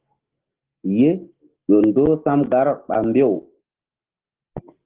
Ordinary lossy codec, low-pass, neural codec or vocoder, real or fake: Opus, 16 kbps; 3.6 kHz; none; real